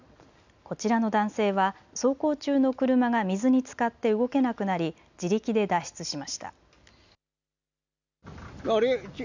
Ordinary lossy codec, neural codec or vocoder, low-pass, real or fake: none; none; 7.2 kHz; real